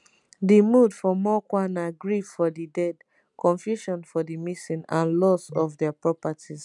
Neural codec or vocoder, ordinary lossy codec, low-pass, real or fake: none; none; 10.8 kHz; real